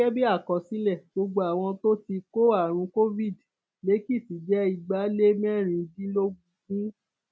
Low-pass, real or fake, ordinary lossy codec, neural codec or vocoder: none; real; none; none